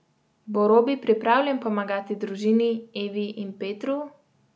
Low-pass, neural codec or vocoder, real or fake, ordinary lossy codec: none; none; real; none